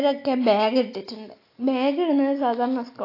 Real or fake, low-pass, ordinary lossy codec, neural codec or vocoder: real; 5.4 kHz; AAC, 32 kbps; none